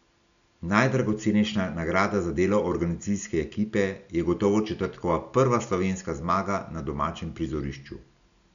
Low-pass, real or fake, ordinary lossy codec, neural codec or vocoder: 7.2 kHz; real; none; none